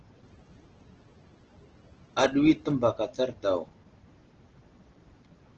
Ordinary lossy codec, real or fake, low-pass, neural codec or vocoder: Opus, 16 kbps; real; 7.2 kHz; none